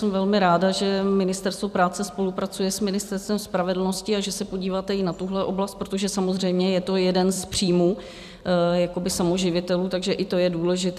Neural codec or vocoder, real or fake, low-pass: vocoder, 44.1 kHz, 128 mel bands every 256 samples, BigVGAN v2; fake; 14.4 kHz